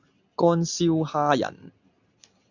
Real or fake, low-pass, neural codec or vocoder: real; 7.2 kHz; none